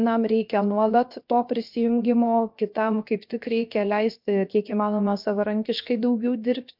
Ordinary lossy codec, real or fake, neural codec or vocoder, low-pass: MP3, 48 kbps; fake; codec, 16 kHz, 0.7 kbps, FocalCodec; 5.4 kHz